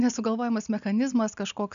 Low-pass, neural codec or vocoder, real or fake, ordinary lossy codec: 7.2 kHz; none; real; MP3, 96 kbps